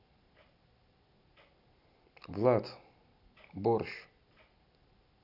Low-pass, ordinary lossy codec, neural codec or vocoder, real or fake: 5.4 kHz; none; none; real